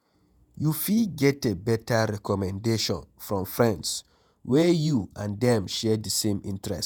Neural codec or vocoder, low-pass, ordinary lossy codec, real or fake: vocoder, 48 kHz, 128 mel bands, Vocos; none; none; fake